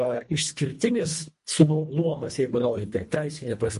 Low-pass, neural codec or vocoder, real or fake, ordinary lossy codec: 10.8 kHz; codec, 24 kHz, 1.5 kbps, HILCodec; fake; MP3, 48 kbps